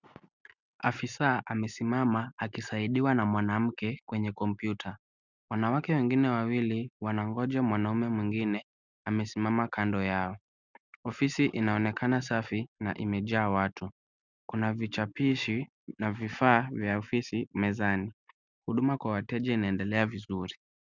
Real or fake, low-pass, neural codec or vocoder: real; 7.2 kHz; none